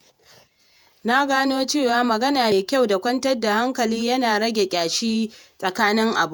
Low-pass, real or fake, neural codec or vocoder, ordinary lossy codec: none; fake; vocoder, 48 kHz, 128 mel bands, Vocos; none